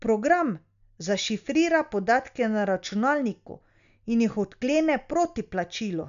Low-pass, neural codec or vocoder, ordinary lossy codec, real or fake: 7.2 kHz; none; none; real